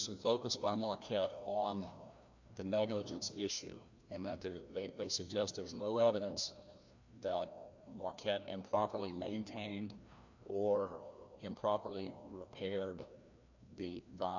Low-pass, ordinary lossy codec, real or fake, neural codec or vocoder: 7.2 kHz; AAC, 48 kbps; fake; codec, 16 kHz, 1 kbps, FreqCodec, larger model